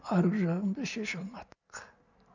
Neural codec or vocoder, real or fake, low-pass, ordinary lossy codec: none; real; 7.2 kHz; none